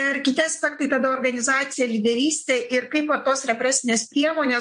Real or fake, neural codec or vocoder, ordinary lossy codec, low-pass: fake; vocoder, 22.05 kHz, 80 mel bands, WaveNeXt; MP3, 48 kbps; 9.9 kHz